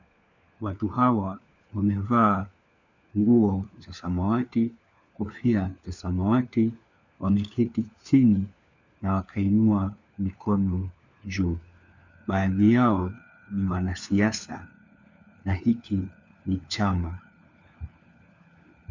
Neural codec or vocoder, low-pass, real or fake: codec, 16 kHz, 4 kbps, FunCodec, trained on LibriTTS, 50 frames a second; 7.2 kHz; fake